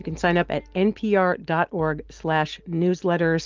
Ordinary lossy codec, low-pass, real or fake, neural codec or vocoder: Opus, 32 kbps; 7.2 kHz; real; none